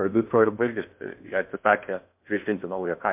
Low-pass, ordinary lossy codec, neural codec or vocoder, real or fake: 3.6 kHz; MP3, 32 kbps; codec, 16 kHz in and 24 kHz out, 0.6 kbps, FocalCodec, streaming, 4096 codes; fake